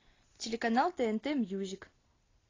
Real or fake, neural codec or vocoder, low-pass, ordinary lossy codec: real; none; 7.2 kHz; AAC, 32 kbps